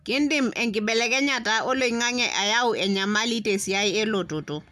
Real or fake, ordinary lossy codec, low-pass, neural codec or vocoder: real; none; 14.4 kHz; none